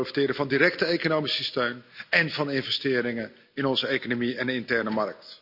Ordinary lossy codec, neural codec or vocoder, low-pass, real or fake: none; none; 5.4 kHz; real